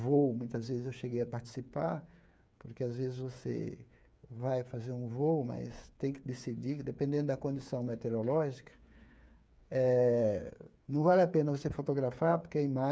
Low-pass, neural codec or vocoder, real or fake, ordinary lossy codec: none; codec, 16 kHz, 8 kbps, FreqCodec, smaller model; fake; none